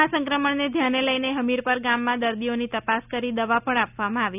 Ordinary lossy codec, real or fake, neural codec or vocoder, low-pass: none; real; none; 3.6 kHz